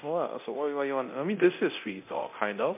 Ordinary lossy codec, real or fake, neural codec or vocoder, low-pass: MP3, 32 kbps; fake; codec, 24 kHz, 0.9 kbps, DualCodec; 3.6 kHz